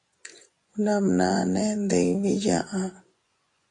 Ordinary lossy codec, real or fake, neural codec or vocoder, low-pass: AAC, 48 kbps; fake; vocoder, 24 kHz, 100 mel bands, Vocos; 10.8 kHz